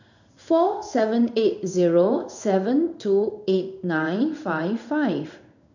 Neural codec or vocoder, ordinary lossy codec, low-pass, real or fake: codec, 16 kHz in and 24 kHz out, 1 kbps, XY-Tokenizer; none; 7.2 kHz; fake